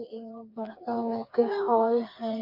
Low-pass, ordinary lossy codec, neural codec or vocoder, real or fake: 5.4 kHz; MP3, 48 kbps; codec, 16 kHz, 4 kbps, FreqCodec, smaller model; fake